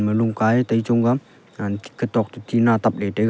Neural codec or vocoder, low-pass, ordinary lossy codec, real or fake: none; none; none; real